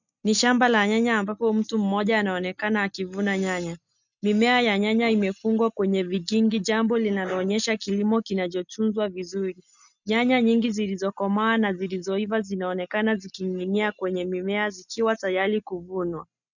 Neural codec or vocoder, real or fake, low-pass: none; real; 7.2 kHz